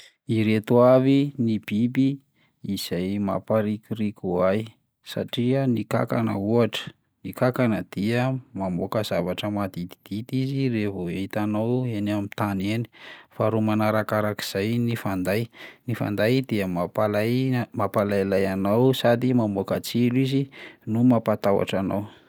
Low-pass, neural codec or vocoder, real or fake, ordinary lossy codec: none; none; real; none